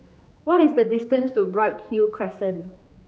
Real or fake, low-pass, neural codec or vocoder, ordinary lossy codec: fake; none; codec, 16 kHz, 2 kbps, X-Codec, HuBERT features, trained on general audio; none